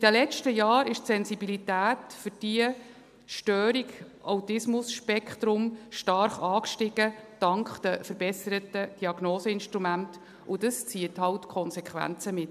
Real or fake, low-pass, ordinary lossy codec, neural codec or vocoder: real; 14.4 kHz; MP3, 96 kbps; none